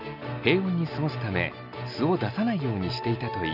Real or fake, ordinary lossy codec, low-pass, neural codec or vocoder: real; none; 5.4 kHz; none